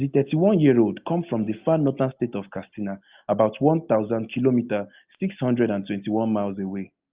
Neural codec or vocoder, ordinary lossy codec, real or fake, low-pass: none; Opus, 32 kbps; real; 3.6 kHz